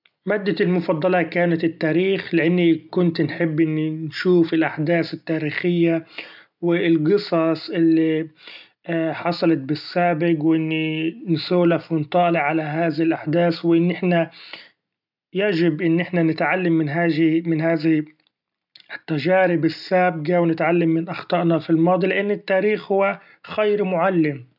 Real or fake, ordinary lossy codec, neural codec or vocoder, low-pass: real; none; none; 5.4 kHz